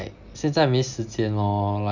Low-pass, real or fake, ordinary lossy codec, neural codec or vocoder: 7.2 kHz; real; none; none